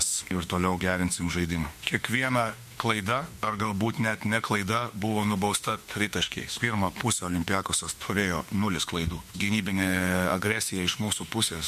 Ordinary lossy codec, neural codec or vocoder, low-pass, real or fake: MP3, 64 kbps; autoencoder, 48 kHz, 32 numbers a frame, DAC-VAE, trained on Japanese speech; 14.4 kHz; fake